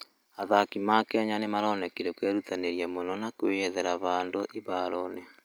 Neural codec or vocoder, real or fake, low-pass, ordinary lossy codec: none; real; none; none